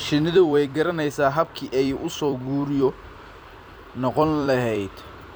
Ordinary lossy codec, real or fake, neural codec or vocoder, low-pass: none; fake; vocoder, 44.1 kHz, 128 mel bands every 256 samples, BigVGAN v2; none